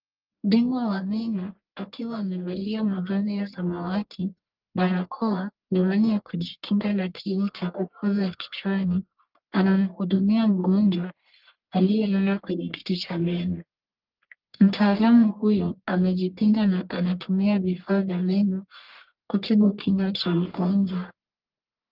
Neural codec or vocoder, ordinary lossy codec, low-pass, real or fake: codec, 44.1 kHz, 1.7 kbps, Pupu-Codec; Opus, 32 kbps; 5.4 kHz; fake